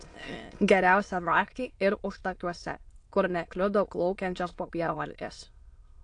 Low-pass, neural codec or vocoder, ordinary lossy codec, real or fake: 9.9 kHz; autoencoder, 22.05 kHz, a latent of 192 numbers a frame, VITS, trained on many speakers; AAC, 48 kbps; fake